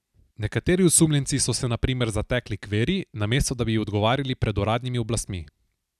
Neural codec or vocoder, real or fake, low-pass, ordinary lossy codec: none; real; 14.4 kHz; none